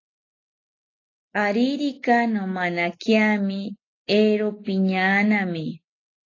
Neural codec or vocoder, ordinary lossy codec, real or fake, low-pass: none; AAC, 32 kbps; real; 7.2 kHz